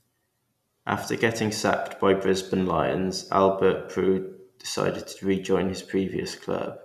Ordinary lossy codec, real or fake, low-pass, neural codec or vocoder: none; real; 14.4 kHz; none